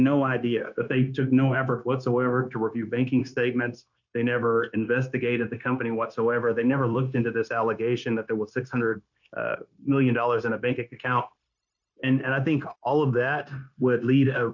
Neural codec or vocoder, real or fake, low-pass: codec, 16 kHz, 0.9 kbps, LongCat-Audio-Codec; fake; 7.2 kHz